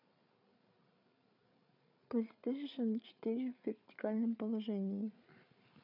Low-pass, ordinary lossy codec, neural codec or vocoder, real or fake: 5.4 kHz; none; codec, 16 kHz, 8 kbps, FreqCodec, larger model; fake